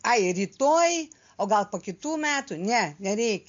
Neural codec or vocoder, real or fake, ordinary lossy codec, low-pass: none; real; MP3, 48 kbps; 7.2 kHz